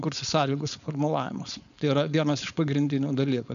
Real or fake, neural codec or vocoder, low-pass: fake; codec, 16 kHz, 4.8 kbps, FACodec; 7.2 kHz